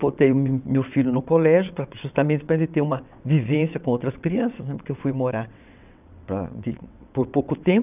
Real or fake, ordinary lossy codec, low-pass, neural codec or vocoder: fake; none; 3.6 kHz; vocoder, 22.05 kHz, 80 mel bands, WaveNeXt